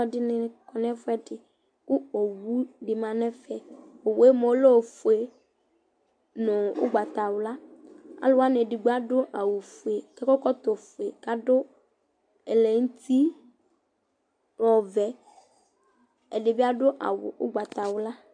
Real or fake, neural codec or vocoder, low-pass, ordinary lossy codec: real; none; 9.9 kHz; AAC, 64 kbps